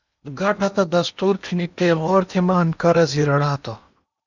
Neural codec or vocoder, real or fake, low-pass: codec, 16 kHz in and 24 kHz out, 0.8 kbps, FocalCodec, streaming, 65536 codes; fake; 7.2 kHz